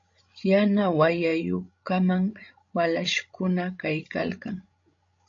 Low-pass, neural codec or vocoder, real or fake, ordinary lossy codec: 7.2 kHz; codec, 16 kHz, 16 kbps, FreqCodec, larger model; fake; AAC, 48 kbps